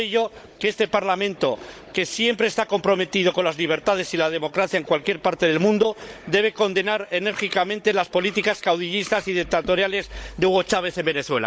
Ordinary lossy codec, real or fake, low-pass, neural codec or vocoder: none; fake; none; codec, 16 kHz, 16 kbps, FunCodec, trained on Chinese and English, 50 frames a second